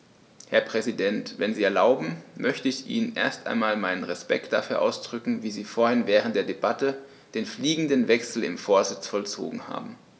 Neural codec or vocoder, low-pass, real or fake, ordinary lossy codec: none; none; real; none